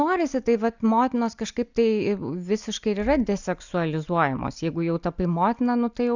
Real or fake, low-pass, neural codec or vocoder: real; 7.2 kHz; none